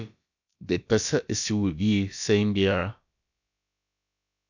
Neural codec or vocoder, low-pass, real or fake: codec, 16 kHz, about 1 kbps, DyCAST, with the encoder's durations; 7.2 kHz; fake